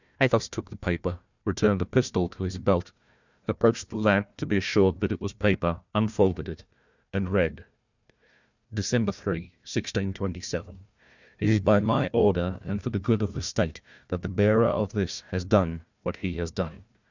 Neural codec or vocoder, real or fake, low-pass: codec, 16 kHz, 1 kbps, FunCodec, trained on Chinese and English, 50 frames a second; fake; 7.2 kHz